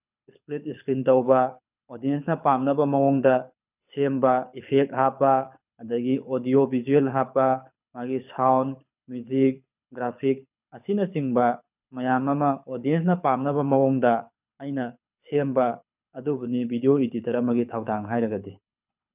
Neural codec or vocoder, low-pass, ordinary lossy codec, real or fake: codec, 24 kHz, 6 kbps, HILCodec; 3.6 kHz; none; fake